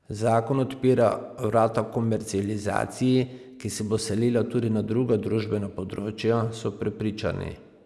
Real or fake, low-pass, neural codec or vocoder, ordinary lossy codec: real; none; none; none